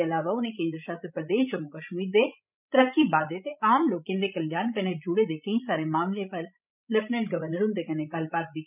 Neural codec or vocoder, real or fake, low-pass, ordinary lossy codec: codec, 16 kHz, 16 kbps, FreqCodec, larger model; fake; 3.6 kHz; none